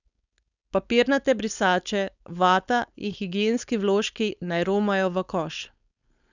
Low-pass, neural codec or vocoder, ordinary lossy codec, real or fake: 7.2 kHz; codec, 16 kHz, 4.8 kbps, FACodec; none; fake